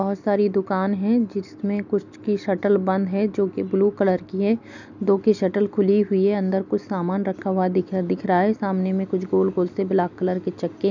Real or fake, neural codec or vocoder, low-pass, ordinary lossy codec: real; none; 7.2 kHz; none